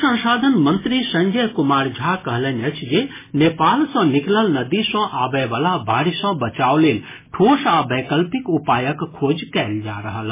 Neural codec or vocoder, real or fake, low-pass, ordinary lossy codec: none; real; 3.6 kHz; MP3, 16 kbps